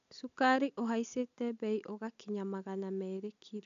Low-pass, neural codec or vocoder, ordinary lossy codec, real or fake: 7.2 kHz; none; MP3, 64 kbps; real